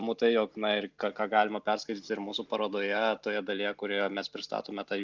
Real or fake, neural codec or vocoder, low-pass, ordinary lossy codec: real; none; 7.2 kHz; Opus, 32 kbps